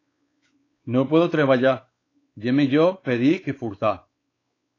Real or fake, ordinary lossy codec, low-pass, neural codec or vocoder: fake; AAC, 32 kbps; 7.2 kHz; codec, 16 kHz, 4 kbps, X-Codec, WavLM features, trained on Multilingual LibriSpeech